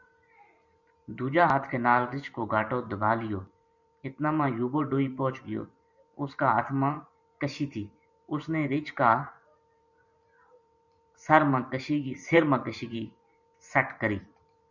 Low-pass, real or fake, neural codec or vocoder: 7.2 kHz; fake; vocoder, 44.1 kHz, 128 mel bands every 512 samples, BigVGAN v2